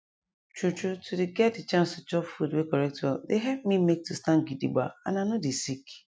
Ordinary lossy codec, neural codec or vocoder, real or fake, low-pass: none; none; real; none